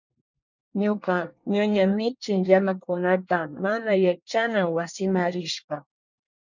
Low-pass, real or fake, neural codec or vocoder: 7.2 kHz; fake; codec, 24 kHz, 1 kbps, SNAC